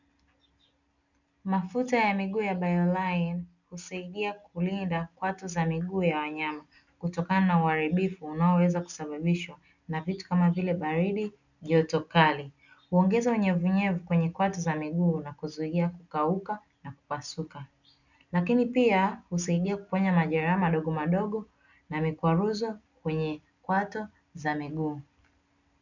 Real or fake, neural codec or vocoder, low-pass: real; none; 7.2 kHz